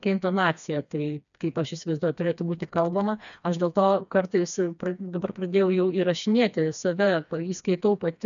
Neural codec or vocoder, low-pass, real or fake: codec, 16 kHz, 2 kbps, FreqCodec, smaller model; 7.2 kHz; fake